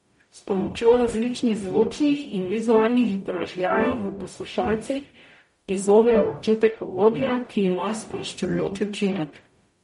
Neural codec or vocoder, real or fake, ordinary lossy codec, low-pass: codec, 44.1 kHz, 0.9 kbps, DAC; fake; MP3, 48 kbps; 19.8 kHz